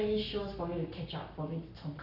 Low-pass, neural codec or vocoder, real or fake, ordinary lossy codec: 5.4 kHz; codec, 44.1 kHz, 7.8 kbps, Pupu-Codec; fake; MP3, 48 kbps